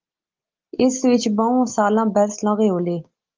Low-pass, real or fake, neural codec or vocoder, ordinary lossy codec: 7.2 kHz; real; none; Opus, 32 kbps